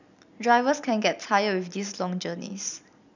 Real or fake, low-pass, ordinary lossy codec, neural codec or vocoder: real; 7.2 kHz; none; none